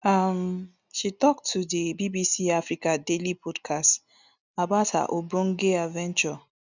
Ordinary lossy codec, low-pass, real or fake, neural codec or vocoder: none; 7.2 kHz; real; none